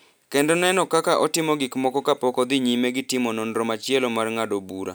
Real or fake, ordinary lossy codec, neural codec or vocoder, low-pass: real; none; none; none